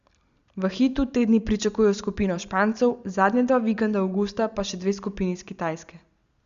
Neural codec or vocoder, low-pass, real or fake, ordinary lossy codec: none; 7.2 kHz; real; Opus, 64 kbps